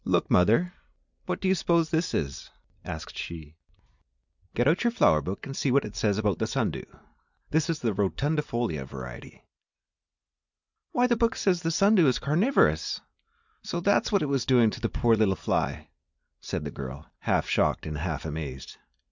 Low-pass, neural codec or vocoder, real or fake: 7.2 kHz; none; real